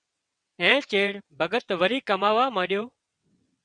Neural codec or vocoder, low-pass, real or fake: vocoder, 22.05 kHz, 80 mel bands, WaveNeXt; 9.9 kHz; fake